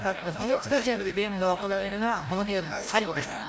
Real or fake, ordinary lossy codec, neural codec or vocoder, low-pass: fake; none; codec, 16 kHz, 0.5 kbps, FreqCodec, larger model; none